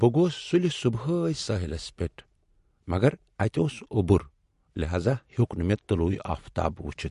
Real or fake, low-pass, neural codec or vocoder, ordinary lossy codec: real; 14.4 kHz; none; MP3, 48 kbps